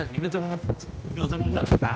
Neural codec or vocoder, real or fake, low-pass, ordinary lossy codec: codec, 16 kHz, 1 kbps, X-Codec, HuBERT features, trained on general audio; fake; none; none